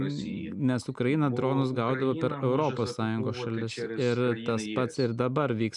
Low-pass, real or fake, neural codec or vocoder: 10.8 kHz; real; none